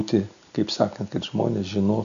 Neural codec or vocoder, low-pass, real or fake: none; 7.2 kHz; real